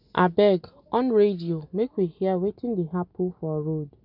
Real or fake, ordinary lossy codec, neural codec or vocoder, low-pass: real; none; none; 5.4 kHz